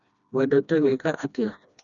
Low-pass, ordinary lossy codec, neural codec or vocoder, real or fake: 7.2 kHz; none; codec, 16 kHz, 1 kbps, FreqCodec, smaller model; fake